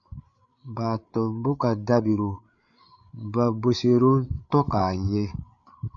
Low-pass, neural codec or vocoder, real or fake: 7.2 kHz; codec, 16 kHz, 8 kbps, FreqCodec, larger model; fake